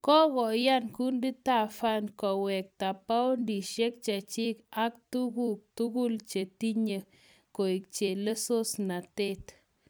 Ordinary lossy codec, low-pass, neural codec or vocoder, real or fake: none; none; vocoder, 44.1 kHz, 128 mel bands every 256 samples, BigVGAN v2; fake